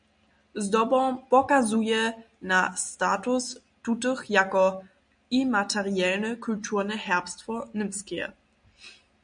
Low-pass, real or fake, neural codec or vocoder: 9.9 kHz; real; none